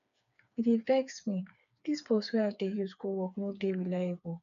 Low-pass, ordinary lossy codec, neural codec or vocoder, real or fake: 7.2 kHz; none; codec, 16 kHz, 4 kbps, FreqCodec, smaller model; fake